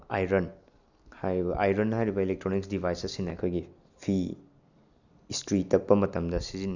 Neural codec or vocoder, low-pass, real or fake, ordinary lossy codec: none; 7.2 kHz; real; none